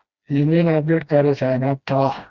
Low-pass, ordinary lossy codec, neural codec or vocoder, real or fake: 7.2 kHz; Opus, 64 kbps; codec, 16 kHz, 1 kbps, FreqCodec, smaller model; fake